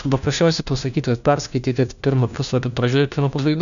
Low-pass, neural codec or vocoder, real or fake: 7.2 kHz; codec, 16 kHz, 1 kbps, FunCodec, trained on LibriTTS, 50 frames a second; fake